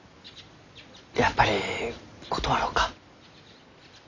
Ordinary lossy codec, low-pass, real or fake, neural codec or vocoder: none; 7.2 kHz; real; none